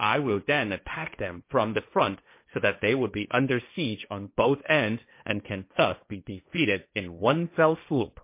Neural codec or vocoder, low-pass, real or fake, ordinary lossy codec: codec, 16 kHz, 1.1 kbps, Voila-Tokenizer; 3.6 kHz; fake; MP3, 24 kbps